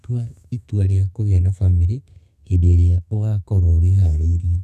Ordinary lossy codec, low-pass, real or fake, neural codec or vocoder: none; 14.4 kHz; fake; codec, 32 kHz, 1.9 kbps, SNAC